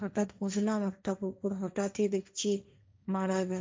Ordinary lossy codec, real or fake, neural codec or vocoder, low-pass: none; fake; codec, 16 kHz, 1.1 kbps, Voila-Tokenizer; none